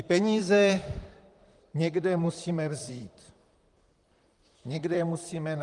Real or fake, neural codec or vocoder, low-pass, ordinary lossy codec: fake; vocoder, 44.1 kHz, 128 mel bands, Pupu-Vocoder; 10.8 kHz; Opus, 32 kbps